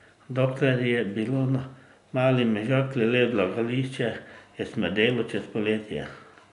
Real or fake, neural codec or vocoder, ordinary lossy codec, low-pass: real; none; none; 10.8 kHz